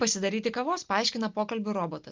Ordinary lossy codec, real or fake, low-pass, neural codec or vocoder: Opus, 24 kbps; real; 7.2 kHz; none